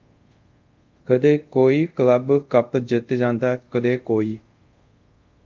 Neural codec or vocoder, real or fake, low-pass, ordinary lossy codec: codec, 24 kHz, 0.5 kbps, DualCodec; fake; 7.2 kHz; Opus, 32 kbps